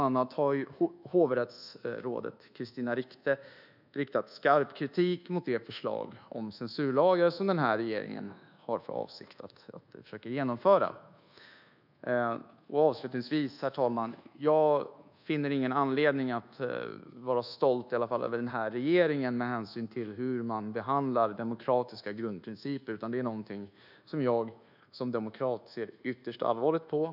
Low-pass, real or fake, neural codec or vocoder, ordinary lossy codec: 5.4 kHz; fake; codec, 24 kHz, 1.2 kbps, DualCodec; none